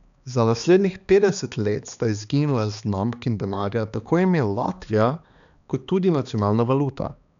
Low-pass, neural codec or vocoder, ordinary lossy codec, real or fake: 7.2 kHz; codec, 16 kHz, 2 kbps, X-Codec, HuBERT features, trained on balanced general audio; none; fake